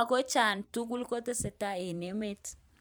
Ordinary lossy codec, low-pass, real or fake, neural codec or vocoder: none; none; fake; vocoder, 44.1 kHz, 128 mel bands every 512 samples, BigVGAN v2